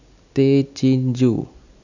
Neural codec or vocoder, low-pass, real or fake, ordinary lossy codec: none; 7.2 kHz; real; none